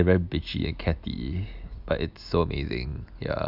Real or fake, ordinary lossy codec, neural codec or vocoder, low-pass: real; none; none; 5.4 kHz